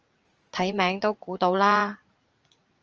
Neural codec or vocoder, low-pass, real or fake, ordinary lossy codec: vocoder, 44.1 kHz, 80 mel bands, Vocos; 7.2 kHz; fake; Opus, 24 kbps